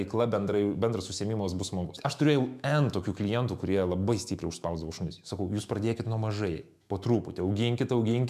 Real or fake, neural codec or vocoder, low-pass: fake; autoencoder, 48 kHz, 128 numbers a frame, DAC-VAE, trained on Japanese speech; 14.4 kHz